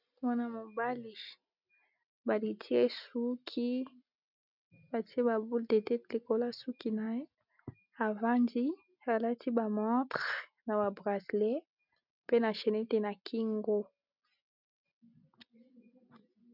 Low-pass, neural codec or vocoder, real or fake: 5.4 kHz; none; real